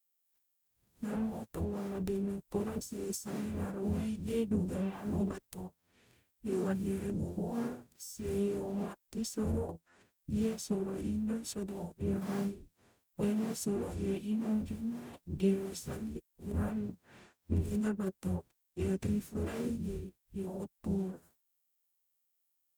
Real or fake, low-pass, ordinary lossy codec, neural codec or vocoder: fake; none; none; codec, 44.1 kHz, 0.9 kbps, DAC